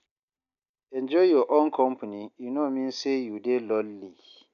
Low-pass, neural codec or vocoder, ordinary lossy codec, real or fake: 7.2 kHz; none; none; real